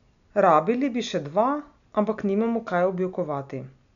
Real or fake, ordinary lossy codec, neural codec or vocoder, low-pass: real; none; none; 7.2 kHz